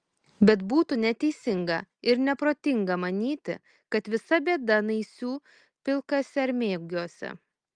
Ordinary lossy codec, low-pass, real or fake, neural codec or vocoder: Opus, 32 kbps; 9.9 kHz; real; none